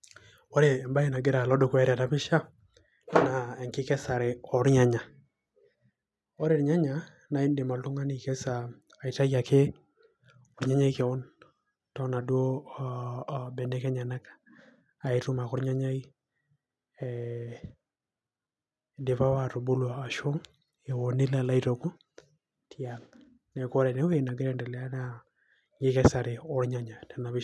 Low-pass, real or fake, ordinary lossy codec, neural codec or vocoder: none; real; none; none